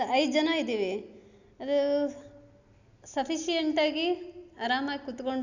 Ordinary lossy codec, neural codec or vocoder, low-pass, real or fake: none; none; 7.2 kHz; real